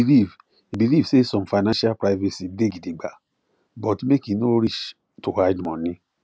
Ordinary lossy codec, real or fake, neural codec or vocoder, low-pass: none; real; none; none